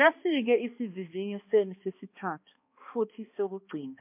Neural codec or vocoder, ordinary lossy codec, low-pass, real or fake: codec, 16 kHz, 2 kbps, X-Codec, HuBERT features, trained on balanced general audio; MP3, 32 kbps; 3.6 kHz; fake